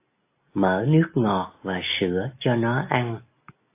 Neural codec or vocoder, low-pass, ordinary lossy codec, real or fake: none; 3.6 kHz; AAC, 24 kbps; real